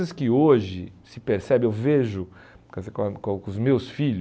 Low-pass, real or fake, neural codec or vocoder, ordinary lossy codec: none; real; none; none